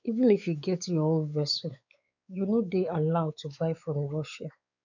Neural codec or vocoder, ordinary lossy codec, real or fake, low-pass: codec, 16 kHz, 4 kbps, X-Codec, WavLM features, trained on Multilingual LibriSpeech; none; fake; 7.2 kHz